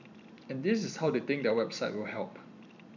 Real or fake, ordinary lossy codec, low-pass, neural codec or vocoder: fake; none; 7.2 kHz; vocoder, 44.1 kHz, 128 mel bands every 256 samples, BigVGAN v2